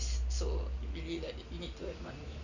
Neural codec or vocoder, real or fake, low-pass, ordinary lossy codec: none; real; 7.2 kHz; none